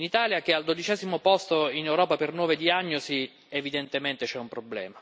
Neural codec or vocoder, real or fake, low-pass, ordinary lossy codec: none; real; none; none